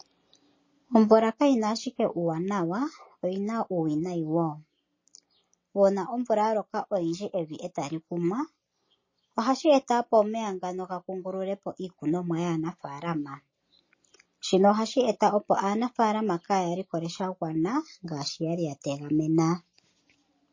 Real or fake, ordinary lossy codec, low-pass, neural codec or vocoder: real; MP3, 32 kbps; 7.2 kHz; none